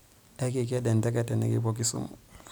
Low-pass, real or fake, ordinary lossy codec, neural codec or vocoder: none; real; none; none